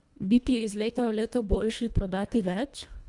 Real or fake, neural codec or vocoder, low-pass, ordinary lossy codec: fake; codec, 24 kHz, 1.5 kbps, HILCodec; 10.8 kHz; Opus, 64 kbps